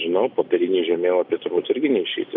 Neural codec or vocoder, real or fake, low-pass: none; real; 5.4 kHz